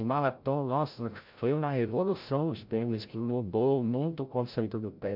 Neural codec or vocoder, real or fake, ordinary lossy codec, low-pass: codec, 16 kHz, 0.5 kbps, FreqCodec, larger model; fake; MP3, 48 kbps; 5.4 kHz